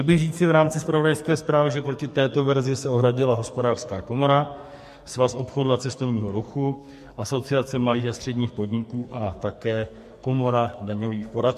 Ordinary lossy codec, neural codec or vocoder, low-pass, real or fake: MP3, 64 kbps; codec, 44.1 kHz, 2.6 kbps, SNAC; 14.4 kHz; fake